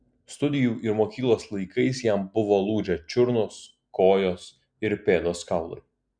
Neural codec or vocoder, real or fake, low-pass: none; real; 9.9 kHz